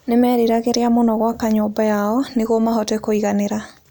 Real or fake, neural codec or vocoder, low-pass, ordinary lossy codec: real; none; none; none